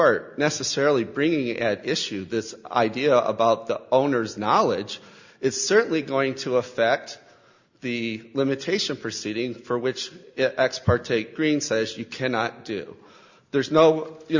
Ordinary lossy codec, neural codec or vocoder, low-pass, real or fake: Opus, 64 kbps; none; 7.2 kHz; real